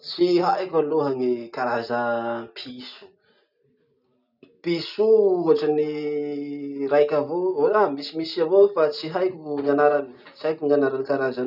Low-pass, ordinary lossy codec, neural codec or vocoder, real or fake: 5.4 kHz; none; none; real